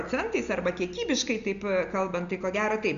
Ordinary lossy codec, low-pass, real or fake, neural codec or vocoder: AAC, 96 kbps; 7.2 kHz; real; none